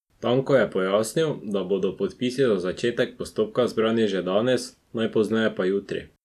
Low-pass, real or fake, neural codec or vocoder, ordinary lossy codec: 14.4 kHz; real; none; none